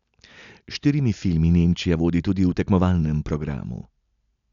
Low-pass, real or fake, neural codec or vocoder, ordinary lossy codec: 7.2 kHz; real; none; none